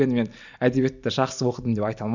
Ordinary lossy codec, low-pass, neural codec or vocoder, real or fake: none; 7.2 kHz; none; real